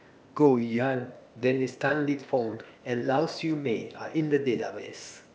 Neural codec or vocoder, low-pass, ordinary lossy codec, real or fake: codec, 16 kHz, 0.8 kbps, ZipCodec; none; none; fake